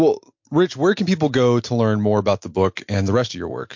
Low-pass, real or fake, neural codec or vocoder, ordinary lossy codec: 7.2 kHz; real; none; MP3, 48 kbps